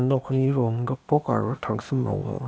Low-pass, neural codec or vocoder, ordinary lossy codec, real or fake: none; codec, 16 kHz, 0.8 kbps, ZipCodec; none; fake